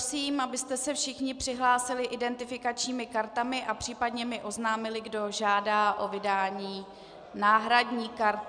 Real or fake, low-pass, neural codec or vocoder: real; 9.9 kHz; none